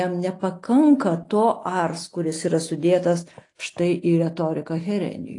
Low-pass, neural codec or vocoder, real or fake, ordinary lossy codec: 10.8 kHz; none; real; AAC, 48 kbps